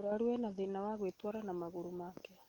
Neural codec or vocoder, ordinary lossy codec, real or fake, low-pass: none; Opus, 24 kbps; real; 19.8 kHz